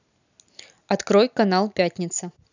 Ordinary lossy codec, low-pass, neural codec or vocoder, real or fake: none; 7.2 kHz; none; real